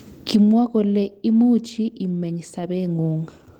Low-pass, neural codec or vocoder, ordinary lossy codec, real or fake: 19.8 kHz; none; Opus, 16 kbps; real